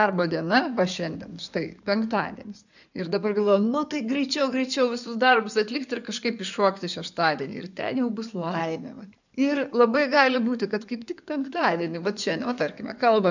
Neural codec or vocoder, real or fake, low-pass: codec, 16 kHz in and 24 kHz out, 2.2 kbps, FireRedTTS-2 codec; fake; 7.2 kHz